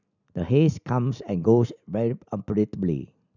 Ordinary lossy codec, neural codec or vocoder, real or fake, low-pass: none; none; real; 7.2 kHz